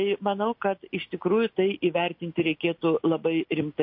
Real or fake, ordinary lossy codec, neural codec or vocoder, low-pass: real; MP3, 32 kbps; none; 5.4 kHz